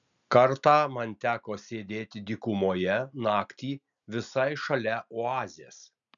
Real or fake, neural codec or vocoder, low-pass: real; none; 7.2 kHz